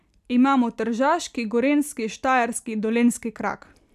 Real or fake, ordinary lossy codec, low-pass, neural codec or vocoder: real; none; 14.4 kHz; none